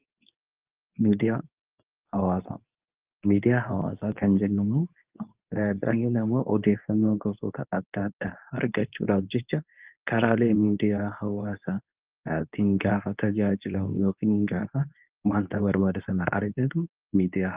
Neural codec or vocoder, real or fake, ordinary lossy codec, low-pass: codec, 24 kHz, 0.9 kbps, WavTokenizer, medium speech release version 2; fake; Opus, 32 kbps; 3.6 kHz